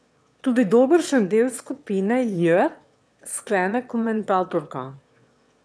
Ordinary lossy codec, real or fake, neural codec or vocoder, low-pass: none; fake; autoencoder, 22.05 kHz, a latent of 192 numbers a frame, VITS, trained on one speaker; none